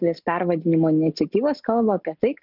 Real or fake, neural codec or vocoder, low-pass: real; none; 5.4 kHz